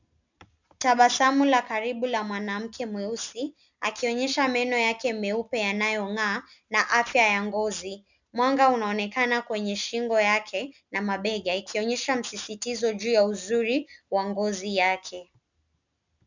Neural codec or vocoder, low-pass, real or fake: none; 7.2 kHz; real